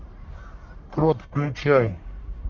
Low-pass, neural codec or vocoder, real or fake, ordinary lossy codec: 7.2 kHz; codec, 44.1 kHz, 1.7 kbps, Pupu-Codec; fake; MP3, 64 kbps